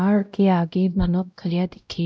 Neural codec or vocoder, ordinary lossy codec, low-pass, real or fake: codec, 16 kHz, 0.5 kbps, X-Codec, WavLM features, trained on Multilingual LibriSpeech; none; none; fake